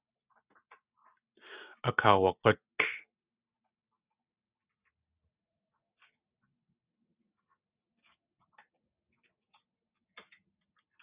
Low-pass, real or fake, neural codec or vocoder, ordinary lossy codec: 3.6 kHz; real; none; Opus, 64 kbps